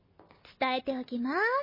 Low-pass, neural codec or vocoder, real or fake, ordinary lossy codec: 5.4 kHz; none; real; MP3, 24 kbps